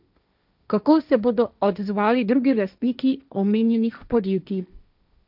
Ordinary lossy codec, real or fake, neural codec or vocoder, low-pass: none; fake; codec, 16 kHz, 1.1 kbps, Voila-Tokenizer; 5.4 kHz